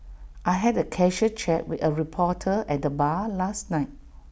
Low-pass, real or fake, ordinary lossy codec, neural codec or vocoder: none; real; none; none